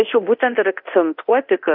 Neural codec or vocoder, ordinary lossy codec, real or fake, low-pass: codec, 24 kHz, 0.9 kbps, DualCodec; AAC, 48 kbps; fake; 5.4 kHz